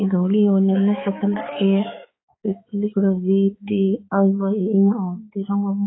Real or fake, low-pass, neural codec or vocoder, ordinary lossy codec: fake; 7.2 kHz; codec, 16 kHz, 4 kbps, X-Codec, HuBERT features, trained on balanced general audio; AAC, 16 kbps